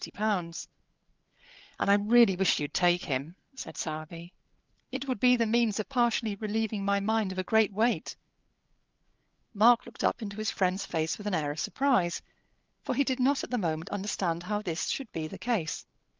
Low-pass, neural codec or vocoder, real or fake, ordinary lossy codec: 7.2 kHz; codec, 16 kHz, 8 kbps, FreqCodec, larger model; fake; Opus, 24 kbps